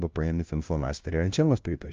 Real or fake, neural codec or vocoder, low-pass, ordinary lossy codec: fake; codec, 16 kHz, 0.5 kbps, FunCodec, trained on LibriTTS, 25 frames a second; 7.2 kHz; Opus, 24 kbps